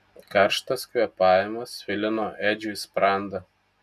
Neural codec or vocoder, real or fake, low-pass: none; real; 14.4 kHz